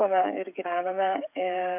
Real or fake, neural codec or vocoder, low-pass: fake; codec, 16 kHz, 8 kbps, FreqCodec, smaller model; 3.6 kHz